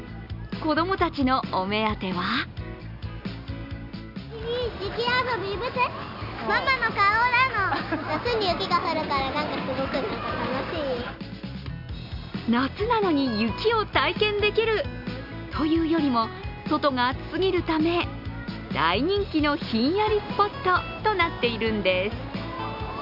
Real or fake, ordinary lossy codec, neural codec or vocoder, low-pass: real; none; none; 5.4 kHz